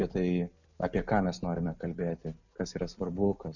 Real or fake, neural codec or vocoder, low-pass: real; none; 7.2 kHz